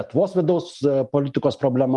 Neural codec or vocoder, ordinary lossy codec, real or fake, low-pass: none; Opus, 32 kbps; real; 10.8 kHz